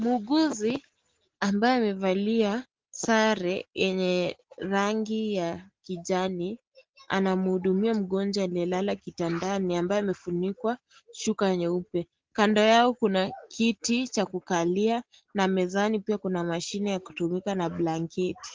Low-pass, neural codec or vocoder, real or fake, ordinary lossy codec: 7.2 kHz; none; real; Opus, 16 kbps